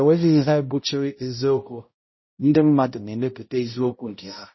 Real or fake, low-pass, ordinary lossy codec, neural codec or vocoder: fake; 7.2 kHz; MP3, 24 kbps; codec, 16 kHz, 0.5 kbps, X-Codec, HuBERT features, trained on balanced general audio